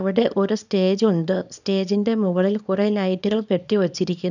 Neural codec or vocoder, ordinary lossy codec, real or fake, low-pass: codec, 24 kHz, 0.9 kbps, WavTokenizer, small release; none; fake; 7.2 kHz